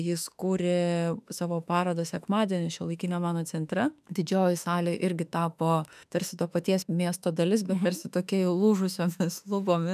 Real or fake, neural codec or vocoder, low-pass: fake; autoencoder, 48 kHz, 32 numbers a frame, DAC-VAE, trained on Japanese speech; 14.4 kHz